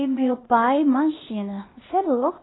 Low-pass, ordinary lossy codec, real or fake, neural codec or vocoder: 7.2 kHz; AAC, 16 kbps; fake; codec, 16 kHz, 0.8 kbps, ZipCodec